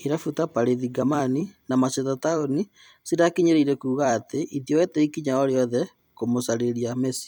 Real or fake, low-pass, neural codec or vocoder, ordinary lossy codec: fake; none; vocoder, 44.1 kHz, 128 mel bands every 256 samples, BigVGAN v2; none